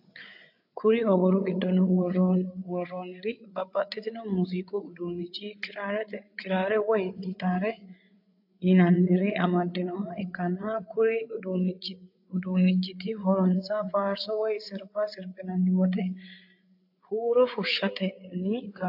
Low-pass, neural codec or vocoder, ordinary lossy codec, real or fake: 5.4 kHz; codec, 16 kHz, 8 kbps, FreqCodec, larger model; AAC, 48 kbps; fake